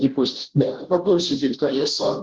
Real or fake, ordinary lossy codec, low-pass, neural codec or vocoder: fake; Opus, 16 kbps; 7.2 kHz; codec, 16 kHz, 0.5 kbps, FunCodec, trained on Chinese and English, 25 frames a second